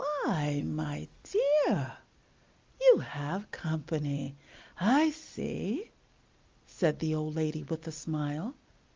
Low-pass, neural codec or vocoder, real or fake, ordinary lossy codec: 7.2 kHz; none; real; Opus, 32 kbps